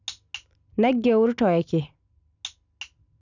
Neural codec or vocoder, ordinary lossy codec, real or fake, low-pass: none; none; real; 7.2 kHz